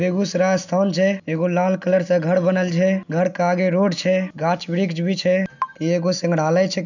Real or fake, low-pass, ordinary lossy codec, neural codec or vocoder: real; 7.2 kHz; none; none